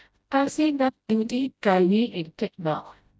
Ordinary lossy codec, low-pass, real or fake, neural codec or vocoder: none; none; fake; codec, 16 kHz, 0.5 kbps, FreqCodec, smaller model